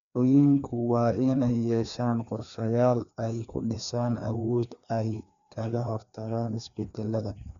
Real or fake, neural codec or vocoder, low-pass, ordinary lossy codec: fake; codec, 16 kHz, 2 kbps, FreqCodec, larger model; 7.2 kHz; none